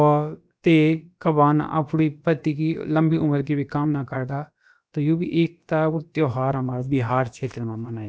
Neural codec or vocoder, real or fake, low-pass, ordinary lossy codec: codec, 16 kHz, about 1 kbps, DyCAST, with the encoder's durations; fake; none; none